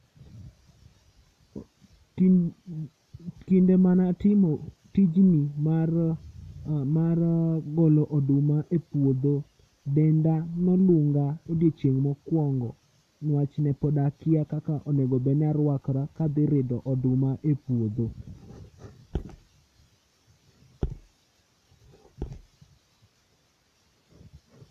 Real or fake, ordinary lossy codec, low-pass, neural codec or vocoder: real; none; 14.4 kHz; none